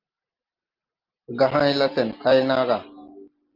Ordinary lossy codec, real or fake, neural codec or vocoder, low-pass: Opus, 32 kbps; real; none; 5.4 kHz